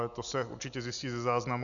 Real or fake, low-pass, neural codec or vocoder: real; 7.2 kHz; none